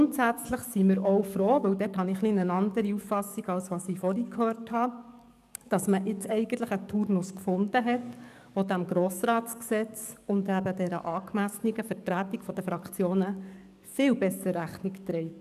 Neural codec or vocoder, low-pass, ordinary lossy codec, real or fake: codec, 44.1 kHz, 7.8 kbps, DAC; 14.4 kHz; none; fake